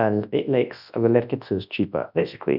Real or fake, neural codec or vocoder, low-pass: fake; codec, 24 kHz, 0.9 kbps, WavTokenizer, large speech release; 5.4 kHz